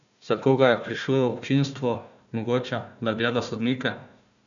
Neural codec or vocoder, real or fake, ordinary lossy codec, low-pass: codec, 16 kHz, 1 kbps, FunCodec, trained on Chinese and English, 50 frames a second; fake; none; 7.2 kHz